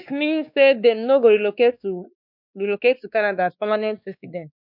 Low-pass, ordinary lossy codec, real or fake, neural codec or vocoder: 5.4 kHz; none; fake; codec, 16 kHz, 2 kbps, X-Codec, WavLM features, trained on Multilingual LibriSpeech